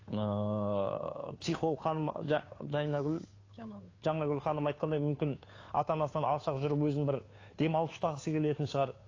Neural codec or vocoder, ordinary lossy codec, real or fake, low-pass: codec, 16 kHz, 2 kbps, FunCodec, trained on Chinese and English, 25 frames a second; AAC, 32 kbps; fake; 7.2 kHz